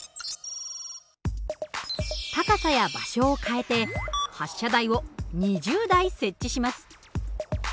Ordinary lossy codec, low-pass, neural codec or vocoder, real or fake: none; none; none; real